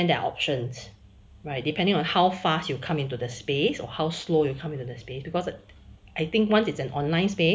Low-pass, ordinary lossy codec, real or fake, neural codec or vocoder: none; none; real; none